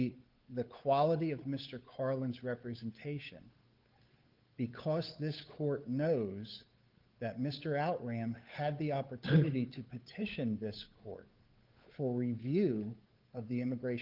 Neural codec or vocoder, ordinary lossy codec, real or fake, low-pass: codec, 16 kHz, 8 kbps, FunCodec, trained on Chinese and English, 25 frames a second; Opus, 32 kbps; fake; 5.4 kHz